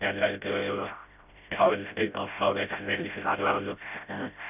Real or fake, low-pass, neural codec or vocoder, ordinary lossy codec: fake; 3.6 kHz; codec, 16 kHz, 0.5 kbps, FreqCodec, smaller model; none